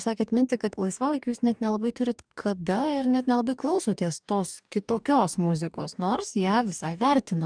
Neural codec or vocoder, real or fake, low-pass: codec, 44.1 kHz, 2.6 kbps, DAC; fake; 9.9 kHz